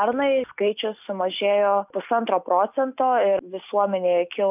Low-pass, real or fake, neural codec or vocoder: 3.6 kHz; real; none